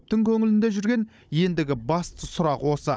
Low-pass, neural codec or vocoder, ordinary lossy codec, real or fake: none; codec, 16 kHz, 16 kbps, FunCodec, trained on Chinese and English, 50 frames a second; none; fake